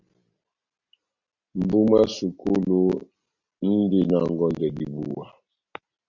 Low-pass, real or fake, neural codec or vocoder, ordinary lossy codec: 7.2 kHz; real; none; Opus, 64 kbps